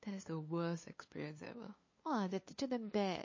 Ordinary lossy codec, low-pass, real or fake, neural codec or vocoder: MP3, 32 kbps; 7.2 kHz; fake; codec, 16 kHz, 2 kbps, FunCodec, trained on LibriTTS, 25 frames a second